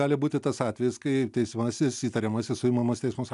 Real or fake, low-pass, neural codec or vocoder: real; 10.8 kHz; none